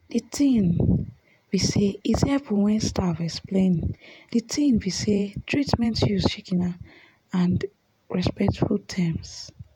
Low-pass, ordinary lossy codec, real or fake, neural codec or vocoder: 19.8 kHz; none; fake; vocoder, 48 kHz, 128 mel bands, Vocos